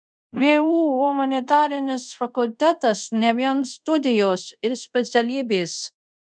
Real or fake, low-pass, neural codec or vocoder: fake; 9.9 kHz; codec, 24 kHz, 0.5 kbps, DualCodec